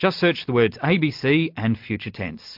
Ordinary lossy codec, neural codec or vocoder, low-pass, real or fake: MP3, 48 kbps; none; 5.4 kHz; real